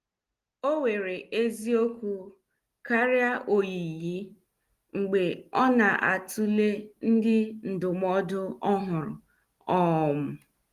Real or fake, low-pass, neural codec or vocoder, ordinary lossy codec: real; 14.4 kHz; none; Opus, 24 kbps